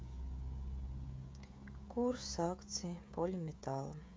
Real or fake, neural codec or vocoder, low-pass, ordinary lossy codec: fake; codec, 16 kHz, 16 kbps, FreqCodec, smaller model; none; none